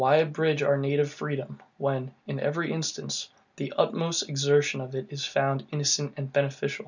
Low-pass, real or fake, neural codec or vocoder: 7.2 kHz; real; none